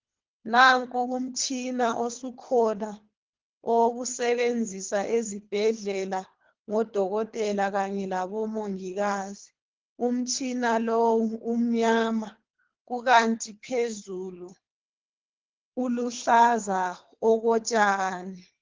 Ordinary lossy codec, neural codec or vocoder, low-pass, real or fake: Opus, 16 kbps; codec, 24 kHz, 3 kbps, HILCodec; 7.2 kHz; fake